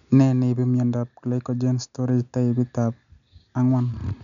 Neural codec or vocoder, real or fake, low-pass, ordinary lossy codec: none; real; 7.2 kHz; none